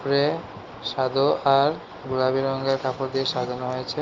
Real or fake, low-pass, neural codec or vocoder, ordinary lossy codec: real; 7.2 kHz; none; Opus, 32 kbps